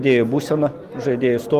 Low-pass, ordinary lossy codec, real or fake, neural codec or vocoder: 14.4 kHz; Opus, 24 kbps; real; none